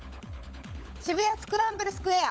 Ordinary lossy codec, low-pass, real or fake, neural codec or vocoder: none; none; fake; codec, 16 kHz, 16 kbps, FunCodec, trained on LibriTTS, 50 frames a second